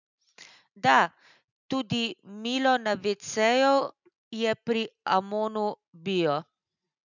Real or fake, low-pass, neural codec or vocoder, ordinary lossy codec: real; 7.2 kHz; none; none